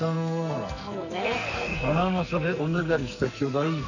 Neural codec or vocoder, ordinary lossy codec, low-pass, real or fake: codec, 44.1 kHz, 2.6 kbps, SNAC; none; 7.2 kHz; fake